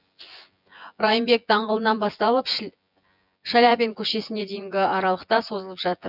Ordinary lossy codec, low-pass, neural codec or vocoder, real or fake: none; 5.4 kHz; vocoder, 24 kHz, 100 mel bands, Vocos; fake